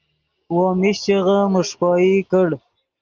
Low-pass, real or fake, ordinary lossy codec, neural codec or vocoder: 7.2 kHz; real; Opus, 24 kbps; none